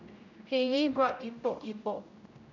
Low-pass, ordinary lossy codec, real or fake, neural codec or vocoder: 7.2 kHz; none; fake; codec, 16 kHz, 0.5 kbps, X-Codec, HuBERT features, trained on general audio